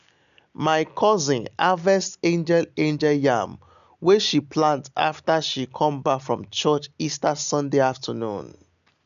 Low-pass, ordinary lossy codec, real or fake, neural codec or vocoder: 7.2 kHz; none; real; none